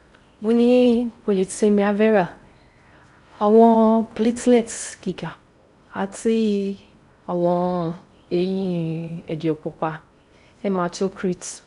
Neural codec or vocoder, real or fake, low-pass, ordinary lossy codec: codec, 16 kHz in and 24 kHz out, 0.6 kbps, FocalCodec, streaming, 4096 codes; fake; 10.8 kHz; none